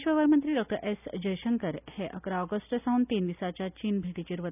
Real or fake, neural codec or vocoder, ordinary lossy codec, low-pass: real; none; none; 3.6 kHz